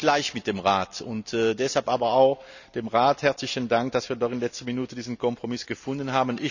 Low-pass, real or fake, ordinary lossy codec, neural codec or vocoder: 7.2 kHz; real; none; none